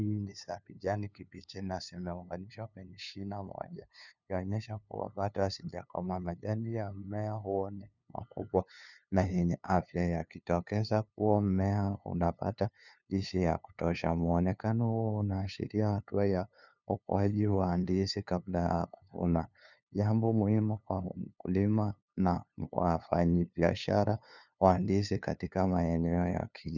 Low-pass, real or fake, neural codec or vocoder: 7.2 kHz; fake; codec, 16 kHz, 2 kbps, FunCodec, trained on LibriTTS, 25 frames a second